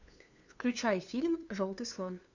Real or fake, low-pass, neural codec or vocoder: fake; 7.2 kHz; codec, 16 kHz, 2 kbps, FunCodec, trained on LibriTTS, 25 frames a second